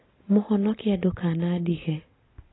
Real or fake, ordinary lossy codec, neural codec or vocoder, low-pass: real; AAC, 16 kbps; none; 7.2 kHz